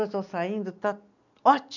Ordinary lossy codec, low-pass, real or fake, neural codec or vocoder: none; 7.2 kHz; real; none